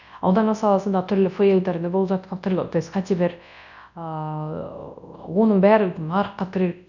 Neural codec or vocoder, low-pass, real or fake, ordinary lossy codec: codec, 24 kHz, 0.9 kbps, WavTokenizer, large speech release; 7.2 kHz; fake; none